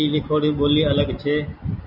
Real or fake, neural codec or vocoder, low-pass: real; none; 5.4 kHz